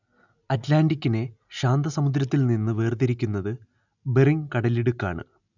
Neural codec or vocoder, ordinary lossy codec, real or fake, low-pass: none; none; real; 7.2 kHz